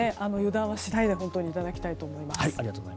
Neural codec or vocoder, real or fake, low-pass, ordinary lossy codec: none; real; none; none